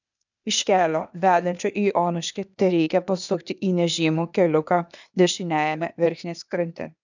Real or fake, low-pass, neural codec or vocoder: fake; 7.2 kHz; codec, 16 kHz, 0.8 kbps, ZipCodec